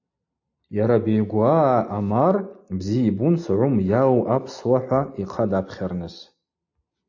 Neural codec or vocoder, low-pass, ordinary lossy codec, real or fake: none; 7.2 kHz; AAC, 48 kbps; real